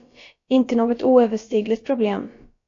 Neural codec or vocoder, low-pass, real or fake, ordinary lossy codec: codec, 16 kHz, about 1 kbps, DyCAST, with the encoder's durations; 7.2 kHz; fake; AAC, 48 kbps